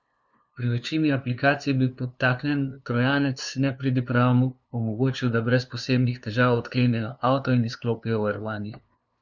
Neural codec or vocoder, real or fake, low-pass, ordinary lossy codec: codec, 16 kHz, 2 kbps, FunCodec, trained on LibriTTS, 25 frames a second; fake; none; none